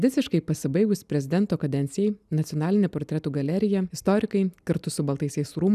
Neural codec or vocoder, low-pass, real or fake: none; 14.4 kHz; real